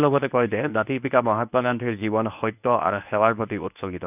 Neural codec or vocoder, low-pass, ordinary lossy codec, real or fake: codec, 24 kHz, 0.9 kbps, WavTokenizer, medium speech release version 1; 3.6 kHz; none; fake